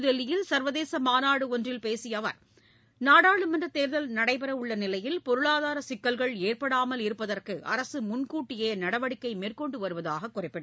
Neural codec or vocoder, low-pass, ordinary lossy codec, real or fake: none; none; none; real